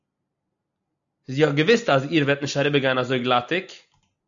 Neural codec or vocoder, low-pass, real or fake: none; 7.2 kHz; real